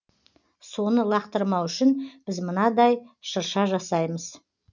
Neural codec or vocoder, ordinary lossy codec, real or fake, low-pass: none; none; real; 7.2 kHz